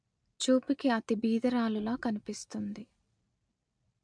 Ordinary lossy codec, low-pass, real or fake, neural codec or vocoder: MP3, 64 kbps; 9.9 kHz; real; none